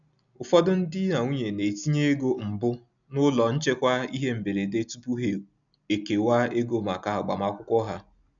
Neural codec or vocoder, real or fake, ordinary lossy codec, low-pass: none; real; none; 7.2 kHz